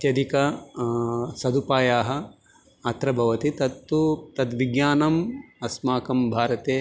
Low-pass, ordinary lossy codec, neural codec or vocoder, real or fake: none; none; none; real